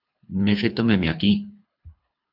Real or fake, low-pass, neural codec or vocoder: fake; 5.4 kHz; codec, 24 kHz, 3 kbps, HILCodec